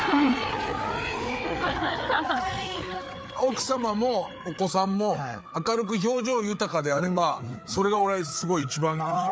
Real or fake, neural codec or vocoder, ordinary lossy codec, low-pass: fake; codec, 16 kHz, 4 kbps, FreqCodec, larger model; none; none